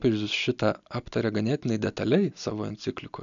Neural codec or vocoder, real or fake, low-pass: none; real; 7.2 kHz